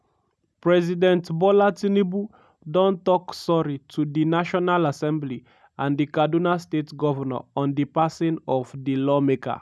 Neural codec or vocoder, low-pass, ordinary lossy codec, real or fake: none; none; none; real